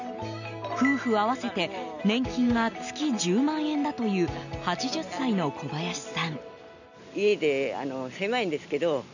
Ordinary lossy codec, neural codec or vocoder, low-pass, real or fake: none; none; 7.2 kHz; real